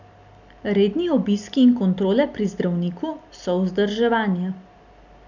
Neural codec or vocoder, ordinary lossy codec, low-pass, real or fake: none; none; 7.2 kHz; real